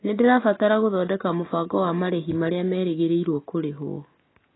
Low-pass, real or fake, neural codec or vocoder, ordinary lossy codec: 7.2 kHz; real; none; AAC, 16 kbps